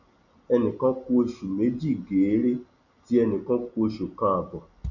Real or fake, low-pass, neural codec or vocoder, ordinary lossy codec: real; 7.2 kHz; none; none